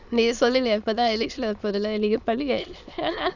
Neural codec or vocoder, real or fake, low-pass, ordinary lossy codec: autoencoder, 22.05 kHz, a latent of 192 numbers a frame, VITS, trained on many speakers; fake; 7.2 kHz; none